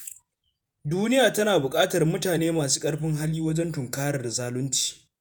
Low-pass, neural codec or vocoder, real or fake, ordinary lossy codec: none; vocoder, 48 kHz, 128 mel bands, Vocos; fake; none